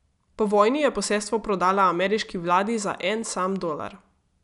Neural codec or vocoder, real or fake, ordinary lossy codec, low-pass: none; real; none; 10.8 kHz